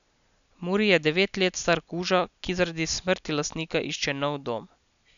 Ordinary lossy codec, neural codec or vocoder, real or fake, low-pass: none; none; real; 7.2 kHz